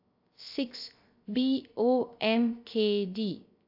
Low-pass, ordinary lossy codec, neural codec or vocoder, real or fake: 5.4 kHz; AAC, 48 kbps; codec, 16 kHz, 0.3 kbps, FocalCodec; fake